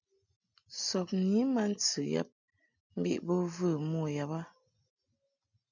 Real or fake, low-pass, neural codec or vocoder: real; 7.2 kHz; none